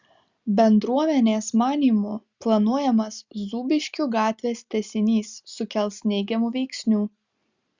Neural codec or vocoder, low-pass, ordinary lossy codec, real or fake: none; 7.2 kHz; Opus, 64 kbps; real